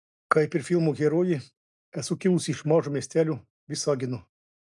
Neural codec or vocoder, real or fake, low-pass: none; real; 10.8 kHz